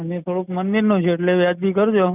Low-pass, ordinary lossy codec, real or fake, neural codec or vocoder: 3.6 kHz; none; real; none